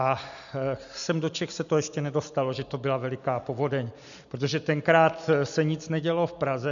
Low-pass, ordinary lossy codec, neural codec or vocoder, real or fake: 7.2 kHz; MP3, 96 kbps; none; real